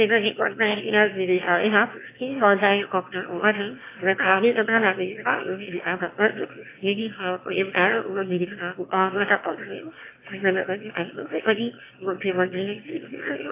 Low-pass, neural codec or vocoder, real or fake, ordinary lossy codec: 3.6 kHz; autoencoder, 22.05 kHz, a latent of 192 numbers a frame, VITS, trained on one speaker; fake; AAC, 24 kbps